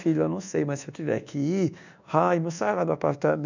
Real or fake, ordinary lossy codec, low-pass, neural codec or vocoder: fake; none; 7.2 kHz; codec, 24 kHz, 1.2 kbps, DualCodec